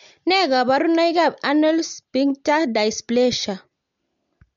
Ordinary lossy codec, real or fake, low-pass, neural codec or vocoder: MP3, 48 kbps; real; 7.2 kHz; none